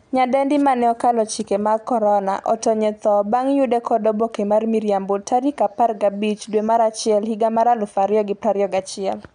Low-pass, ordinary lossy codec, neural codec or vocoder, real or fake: 9.9 kHz; none; none; real